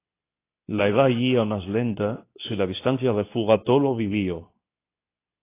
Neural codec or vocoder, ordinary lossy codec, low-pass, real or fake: codec, 24 kHz, 0.9 kbps, WavTokenizer, medium speech release version 2; AAC, 24 kbps; 3.6 kHz; fake